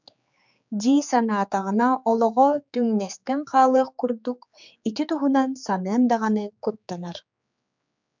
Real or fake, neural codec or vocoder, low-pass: fake; codec, 16 kHz, 4 kbps, X-Codec, HuBERT features, trained on general audio; 7.2 kHz